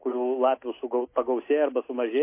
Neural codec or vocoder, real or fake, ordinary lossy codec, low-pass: none; real; MP3, 24 kbps; 3.6 kHz